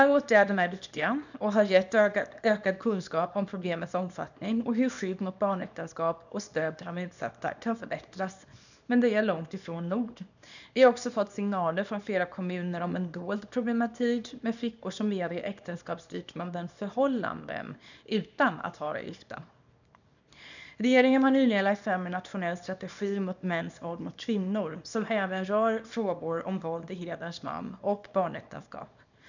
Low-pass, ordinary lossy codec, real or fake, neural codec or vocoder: 7.2 kHz; none; fake; codec, 24 kHz, 0.9 kbps, WavTokenizer, small release